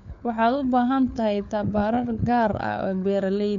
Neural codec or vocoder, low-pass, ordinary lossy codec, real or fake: codec, 16 kHz, 4 kbps, FunCodec, trained on LibriTTS, 50 frames a second; 7.2 kHz; none; fake